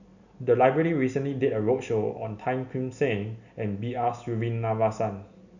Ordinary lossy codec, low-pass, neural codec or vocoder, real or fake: none; 7.2 kHz; none; real